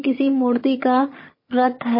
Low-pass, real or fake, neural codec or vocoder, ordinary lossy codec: 5.4 kHz; fake; vocoder, 22.05 kHz, 80 mel bands, HiFi-GAN; MP3, 24 kbps